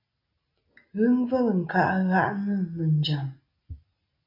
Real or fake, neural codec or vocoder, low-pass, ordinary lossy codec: real; none; 5.4 kHz; MP3, 48 kbps